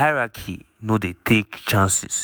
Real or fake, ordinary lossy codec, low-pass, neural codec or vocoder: fake; none; none; autoencoder, 48 kHz, 128 numbers a frame, DAC-VAE, trained on Japanese speech